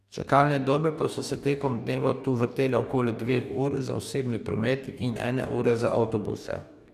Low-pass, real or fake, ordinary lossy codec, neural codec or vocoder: 14.4 kHz; fake; none; codec, 44.1 kHz, 2.6 kbps, DAC